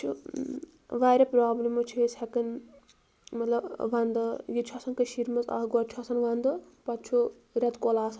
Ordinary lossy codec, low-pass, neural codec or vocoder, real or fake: none; none; none; real